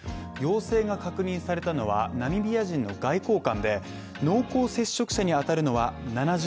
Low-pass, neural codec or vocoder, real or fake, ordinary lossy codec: none; none; real; none